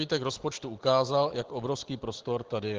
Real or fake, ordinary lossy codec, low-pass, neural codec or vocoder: real; Opus, 16 kbps; 7.2 kHz; none